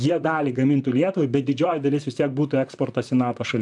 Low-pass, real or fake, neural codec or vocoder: 10.8 kHz; fake; vocoder, 44.1 kHz, 128 mel bands, Pupu-Vocoder